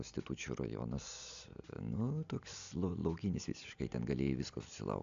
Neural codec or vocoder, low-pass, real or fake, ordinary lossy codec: none; 7.2 kHz; real; AAC, 64 kbps